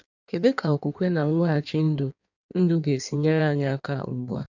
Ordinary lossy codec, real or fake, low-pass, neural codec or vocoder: none; fake; 7.2 kHz; codec, 16 kHz in and 24 kHz out, 1.1 kbps, FireRedTTS-2 codec